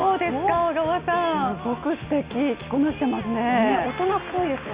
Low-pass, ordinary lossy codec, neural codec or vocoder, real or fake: 3.6 kHz; Opus, 64 kbps; none; real